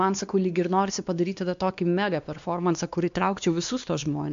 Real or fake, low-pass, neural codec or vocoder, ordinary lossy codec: fake; 7.2 kHz; codec, 16 kHz, 2 kbps, X-Codec, WavLM features, trained on Multilingual LibriSpeech; MP3, 64 kbps